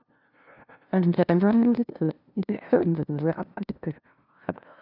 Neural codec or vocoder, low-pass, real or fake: codec, 16 kHz, 0.5 kbps, FunCodec, trained on LibriTTS, 25 frames a second; 5.4 kHz; fake